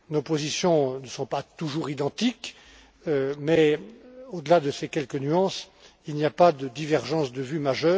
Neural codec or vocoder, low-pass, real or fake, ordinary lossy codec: none; none; real; none